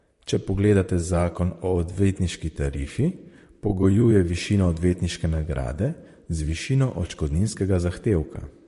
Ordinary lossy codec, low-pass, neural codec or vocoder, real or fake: MP3, 48 kbps; 14.4 kHz; vocoder, 44.1 kHz, 128 mel bands, Pupu-Vocoder; fake